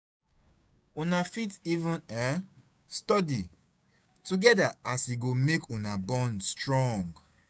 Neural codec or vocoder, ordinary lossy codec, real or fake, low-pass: codec, 16 kHz, 6 kbps, DAC; none; fake; none